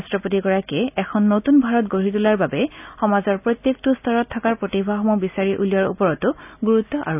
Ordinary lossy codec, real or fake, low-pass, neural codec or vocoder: none; real; 3.6 kHz; none